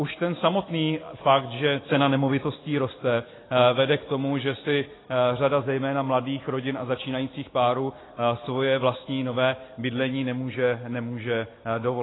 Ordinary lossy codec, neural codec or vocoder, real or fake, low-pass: AAC, 16 kbps; none; real; 7.2 kHz